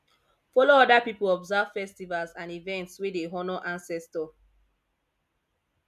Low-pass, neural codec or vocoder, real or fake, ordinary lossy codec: 14.4 kHz; none; real; none